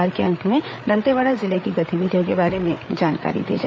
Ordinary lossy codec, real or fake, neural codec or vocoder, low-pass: none; fake; codec, 16 kHz, 8 kbps, FreqCodec, larger model; none